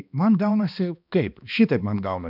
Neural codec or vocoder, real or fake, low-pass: codec, 16 kHz, 2 kbps, X-Codec, HuBERT features, trained on balanced general audio; fake; 5.4 kHz